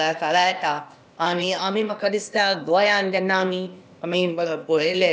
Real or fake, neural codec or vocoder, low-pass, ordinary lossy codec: fake; codec, 16 kHz, 0.8 kbps, ZipCodec; none; none